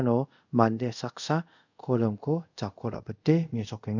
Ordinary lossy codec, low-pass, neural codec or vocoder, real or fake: none; 7.2 kHz; codec, 24 kHz, 0.5 kbps, DualCodec; fake